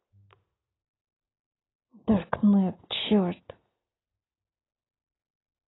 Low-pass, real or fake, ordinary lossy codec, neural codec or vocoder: 7.2 kHz; real; AAC, 16 kbps; none